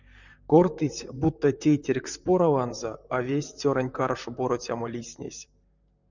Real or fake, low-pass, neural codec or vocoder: fake; 7.2 kHz; vocoder, 44.1 kHz, 128 mel bands, Pupu-Vocoder